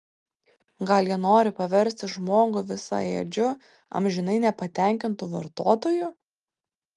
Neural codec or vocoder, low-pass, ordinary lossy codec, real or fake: none; 10.8 kHz; Opus, 32 kbps; real